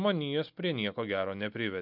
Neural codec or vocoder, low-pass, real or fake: none; 5.4 kHz; real